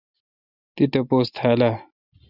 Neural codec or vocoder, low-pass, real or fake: none; 5.4 kHz; real